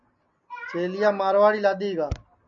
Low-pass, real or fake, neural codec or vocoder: 7.2 kHz; real; none